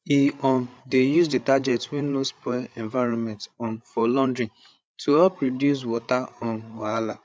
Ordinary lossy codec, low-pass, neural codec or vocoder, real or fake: none; none; codec, 16 kHz, 8 kbps, FreqCodec, larger model; fake